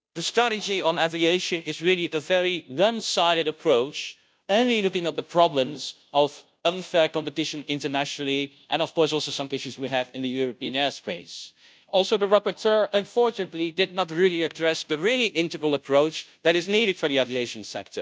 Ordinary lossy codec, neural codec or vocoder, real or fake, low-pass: none; codec, 16 kHz, 0.5 kbps, FunCodec, trained on Chinese and English, 25 frames a second; fake; none